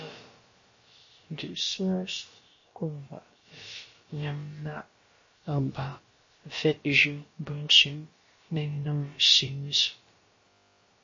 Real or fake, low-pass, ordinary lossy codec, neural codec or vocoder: fake; 7.2 kHz; MP3, 32 kbps; codec, 16 kHz, about 1 kbps, DyCAST, with the encoder's durations